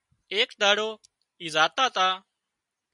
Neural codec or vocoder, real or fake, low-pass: none; real; 10.8 kHz